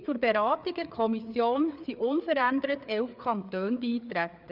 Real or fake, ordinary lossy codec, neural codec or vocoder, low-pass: fake; none; codec, 16 kHz, 4 kbps, FreqCodec, larger model; 5.4 kHz